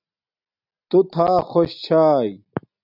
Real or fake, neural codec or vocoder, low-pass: real; none; 5.4 kHz